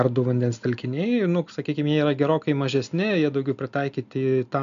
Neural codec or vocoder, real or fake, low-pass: none; real; 7.2 kHz